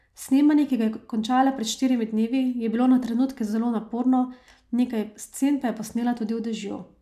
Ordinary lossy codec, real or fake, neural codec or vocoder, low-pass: AAC, 96 kbps; real; none; 14.4 kHz